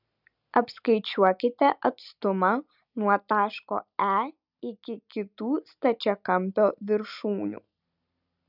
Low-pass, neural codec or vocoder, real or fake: 5.4 kHz; none; real